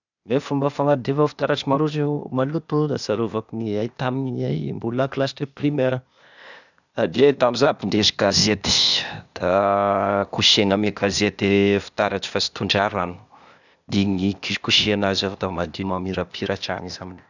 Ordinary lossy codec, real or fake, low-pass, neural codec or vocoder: none; fake; 7.2 kHz; codec, 16 kHz, 0.8 kbps, ZipCodec